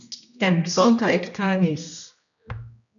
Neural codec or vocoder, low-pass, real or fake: codec, 16 kHz, 1 kbps, X-Codec, HuBERT features, trained on general audio; 7.2 kHz; fake